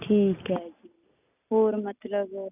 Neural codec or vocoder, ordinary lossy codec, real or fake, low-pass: none; none; real; 3.6 kHz